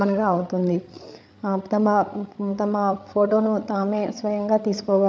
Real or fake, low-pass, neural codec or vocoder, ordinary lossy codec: fake; none; codec, 16 kHz, 8 kbps, FreqCodec, larger model; none